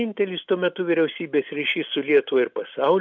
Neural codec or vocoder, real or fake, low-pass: none; real; 7.2 kHz